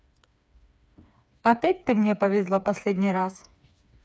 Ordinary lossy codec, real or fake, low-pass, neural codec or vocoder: none; fake; none; codec, 16 kHz, 4 kbps, FreqCodec, smaller model